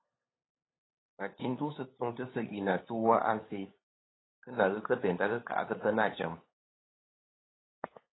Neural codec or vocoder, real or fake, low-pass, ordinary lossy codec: codec, 16 kHz, 8 kbps, FunCodec, trained on LibriTTS, 25 frames a second; fake; 7.2 kHz; AAC, 16 kbps